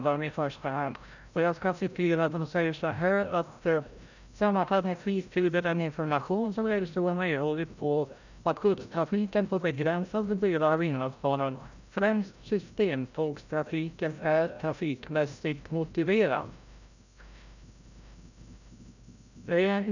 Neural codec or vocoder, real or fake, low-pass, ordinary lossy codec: codec, 16 kHz, 0.5 kbps, FreqCodec, larger model; fake; 7.2 kHz; none